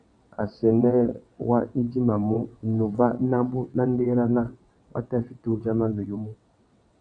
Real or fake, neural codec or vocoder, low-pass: fake; vocoder, 22.05 kHz, 80 mel bands, WaveNeXt; 9.9 kHz